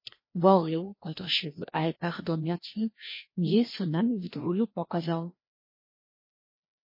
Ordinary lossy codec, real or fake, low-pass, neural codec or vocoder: MP3, 24 kbps; fake; 5.4 kHz; codec, 16 kHz, 1 kbps, FreqCodec, larger model